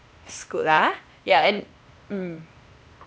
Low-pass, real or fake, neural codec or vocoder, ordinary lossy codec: none; fake; codec, 16 kHz, 0.8 kbps, ZipCodec; none